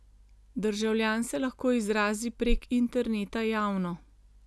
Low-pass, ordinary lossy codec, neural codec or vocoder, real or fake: none; none; none; real